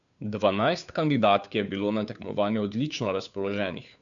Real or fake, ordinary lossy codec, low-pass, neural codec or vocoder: fake; none; 7.2 kHz; codec, 16 kHz, 2 kbps, FunCodec, trained on Chinese and English, 25 frames a second